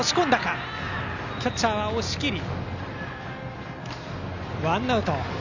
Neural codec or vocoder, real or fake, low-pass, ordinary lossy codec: none; real; 7.2 kHz; none